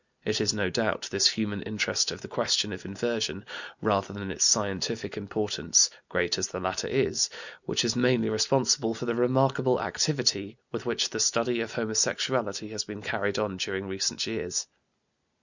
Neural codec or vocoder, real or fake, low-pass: none; real; 7.2 kHz